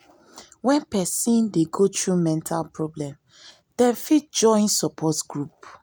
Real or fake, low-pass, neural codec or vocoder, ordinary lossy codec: real; none; none; none